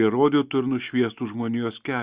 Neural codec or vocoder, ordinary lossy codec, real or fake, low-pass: none; Opus, 64 kbps; real; 3.6 kHz